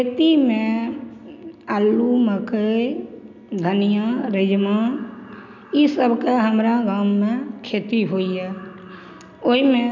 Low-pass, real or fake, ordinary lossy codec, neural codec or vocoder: 7.2 kHz; real; none; none